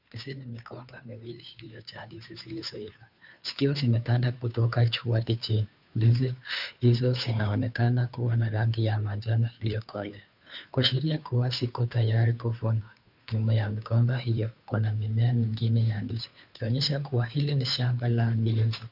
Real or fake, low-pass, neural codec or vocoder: fake; 5.4 kHz; codec, 16 kHz, 2 kbps, FunCodec, trained on Chinese and English, 25 frames a second